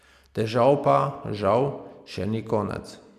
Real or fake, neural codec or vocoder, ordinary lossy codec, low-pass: real; none; MP3, 96 kbps; 14.4 kHz